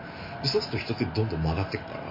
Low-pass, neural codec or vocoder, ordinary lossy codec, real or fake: 5.4 kHz; none; MP3, 24 kbps; real